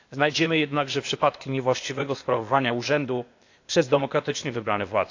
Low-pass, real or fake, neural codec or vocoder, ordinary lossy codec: 7.2 kHz; fake; codec, 16 kHz, 0.8 kbps, ZipCodec; AAC, 48 kbps